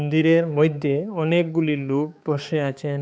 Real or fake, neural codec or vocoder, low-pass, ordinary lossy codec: fake; codec, 16 kHz, 4 kbps, X-Codec, HuBERT features, trained on balanced general audio; none; none